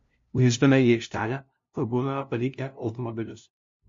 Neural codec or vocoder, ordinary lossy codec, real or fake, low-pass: codec, 16 kHz, 0.5 kbps, FunCodec, trained on LibriTTS, 25 frames a second; MP3, 48 kbps; fake; 7.2 kHz